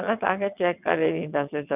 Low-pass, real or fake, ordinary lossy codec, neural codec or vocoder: 3.6 kHz; fake; none; vocoder, 22.05 kHz, 80 mel bands, WaveNeXt